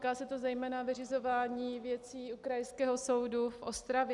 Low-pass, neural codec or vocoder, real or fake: 10.8 kHz; none; real